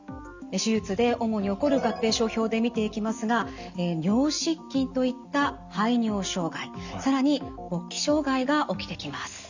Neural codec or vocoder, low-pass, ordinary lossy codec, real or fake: none; 7.2 kHz; Opus, 64 kbps; real